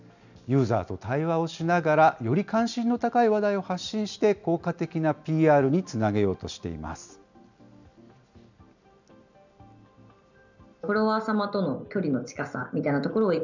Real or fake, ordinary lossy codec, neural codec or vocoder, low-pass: real; none; none; 7.2 kHz